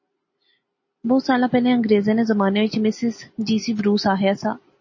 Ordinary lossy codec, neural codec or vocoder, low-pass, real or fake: MP3, 32 kbps; none; 7.2 kHz; real